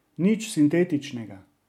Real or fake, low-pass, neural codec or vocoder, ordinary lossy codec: real; 19.8 kHz; none; MP3, 96 kbps